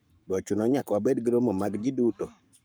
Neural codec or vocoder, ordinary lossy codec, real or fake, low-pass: codec, 44.1 kHz, 7.8 kbps, Pupu-Codec; none; fake; none